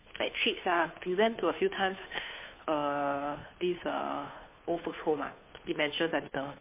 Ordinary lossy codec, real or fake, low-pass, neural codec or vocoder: MP3, 24 kbps; fake; 3.6 kHz; codec, 16 kHz, 2 kbps, FunCodec, trained on Chinese and English, 25 frames a second